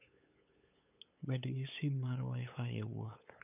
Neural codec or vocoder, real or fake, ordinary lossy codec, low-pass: codec, 16 kHz, 8 kbps, FunCodec, trained on LibriTTS, 25 frames a second; fake; none; 3.6 kHz